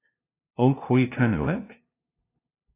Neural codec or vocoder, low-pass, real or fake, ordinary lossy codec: codec, 16 kHz, 0.5 kbps, FunCodec, trained on LibriTTS, 25 frames a second; 3.6 kHz; fake; AAC, 16 kbps